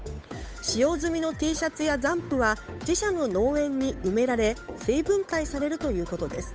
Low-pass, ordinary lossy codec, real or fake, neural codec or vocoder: none; none; fake; codec, 16 kHz, 8 kbps, FunCodec, trained on Chinese and English, 25 frames a second